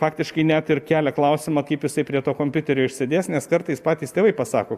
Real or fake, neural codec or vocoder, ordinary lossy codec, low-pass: real; none; AAC, 96 kbps; 14.4 kHz